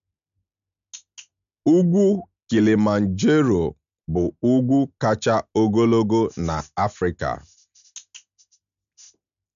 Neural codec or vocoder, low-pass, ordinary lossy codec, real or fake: none; 7.2 kHz; none; real